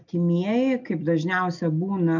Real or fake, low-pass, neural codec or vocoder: real; 7.2 kHz; none